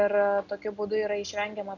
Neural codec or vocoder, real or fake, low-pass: none; real; 7.2 kHz